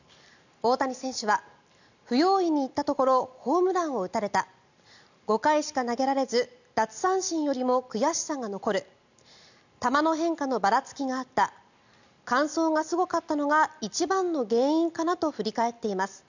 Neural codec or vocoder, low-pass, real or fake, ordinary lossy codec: none; 7.2 kHz; real; none